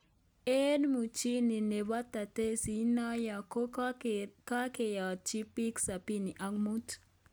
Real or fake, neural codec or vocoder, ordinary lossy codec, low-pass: real; none; none; none